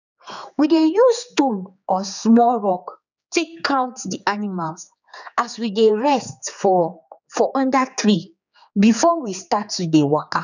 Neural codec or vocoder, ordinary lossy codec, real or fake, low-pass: codec, 16 kHz, 4 kbps, X-Codec, HuBERT features, trained on general audio; none; fake; 7.2 kHz